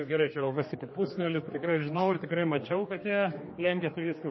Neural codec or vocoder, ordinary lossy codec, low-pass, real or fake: codec, 16 kHz, 2 kbps, X-Codec, HuBERT features, trained on general audio; MP3, 24 kbps; 7.2 kHz; fake